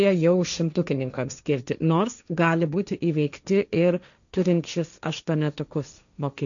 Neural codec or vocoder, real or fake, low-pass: codec, 16 kHz, 1.1 kbps, Voila-Tokenizer; fake; 7.2 kHz